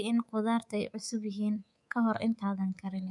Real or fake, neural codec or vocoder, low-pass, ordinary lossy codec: fake; codec, 24 kHz, 3.1 kbps, DualCodec; 10.8 kHz; none